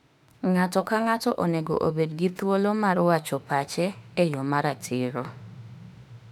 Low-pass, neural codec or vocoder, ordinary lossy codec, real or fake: 19.8 kHz; autoencoder, 48 kHz, 32 numbers a frame, DAC-VAE, trained on Japanese speech; none; fake